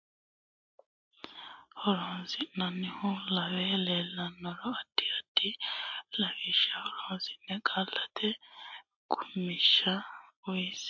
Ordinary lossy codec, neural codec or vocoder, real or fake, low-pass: MP3, 48 kbps; none; real; 7.2 kHz